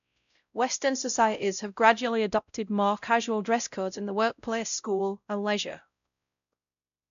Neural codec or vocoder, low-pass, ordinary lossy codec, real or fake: codec, 16 kHz, 0.5 kbps, X-Codec, WavLM features, trained on Multilingual LibriSpeech; 7.2 kHz; none; fake